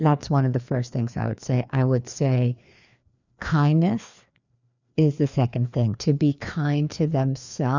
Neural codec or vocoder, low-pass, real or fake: codec, 16 kHz, 2 kbps, FreqCodec, larger model; 7.2 kHz; fake